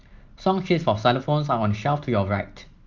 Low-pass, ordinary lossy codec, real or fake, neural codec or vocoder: 7.2 kHz; Opus, 24 kbps; real; none